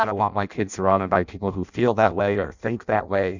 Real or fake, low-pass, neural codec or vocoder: fake; 7.2 kHz; codec, 16 kHz in and 24 kHz out, 0.6 kbps, FireRedTTS-2 codec